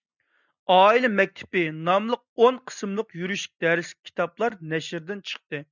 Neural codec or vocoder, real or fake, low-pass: none; real; 7.2 kHz